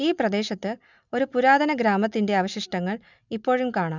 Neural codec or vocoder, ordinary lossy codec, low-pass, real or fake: none; none; 7.2 kHz; real